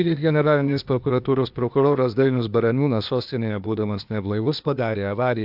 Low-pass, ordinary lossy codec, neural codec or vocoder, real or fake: 5.4 kHz; AAC, 48 kbps; codec, 16 kHz, 0.8 kbps, ZipCodec; fake